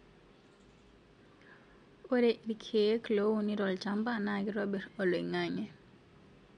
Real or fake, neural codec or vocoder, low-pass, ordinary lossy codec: real; none; 9.9 kHz; MP3, 64 kbps